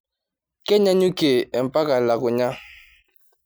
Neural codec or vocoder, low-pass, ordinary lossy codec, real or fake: none; none; none; real